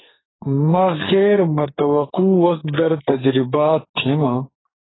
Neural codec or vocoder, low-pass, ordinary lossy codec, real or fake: codec, 44.1 kHz, 2.6 kbps, SNAC; 7.2 kHz; AAC, 16 kbps; fake